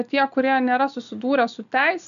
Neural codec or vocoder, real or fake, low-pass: none; real; 7.2 kHz